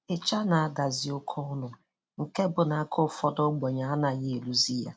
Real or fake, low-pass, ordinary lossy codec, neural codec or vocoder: real; none; none; none